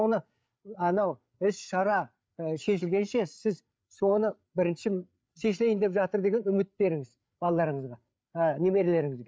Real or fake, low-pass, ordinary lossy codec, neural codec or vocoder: fake; none; none; codec, 16 kHz, 8 kbps, FreqCodec, larger model